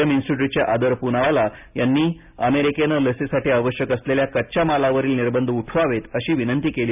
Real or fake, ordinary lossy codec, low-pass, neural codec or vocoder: real; none; 3.6 kHz; none